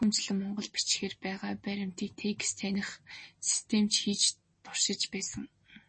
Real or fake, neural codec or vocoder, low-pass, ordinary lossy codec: real; none; 9.9 kHz; MP3, 32 kbps